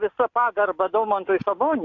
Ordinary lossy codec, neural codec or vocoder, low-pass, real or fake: AAC, 48 kbps; none; 7.2 kHz; real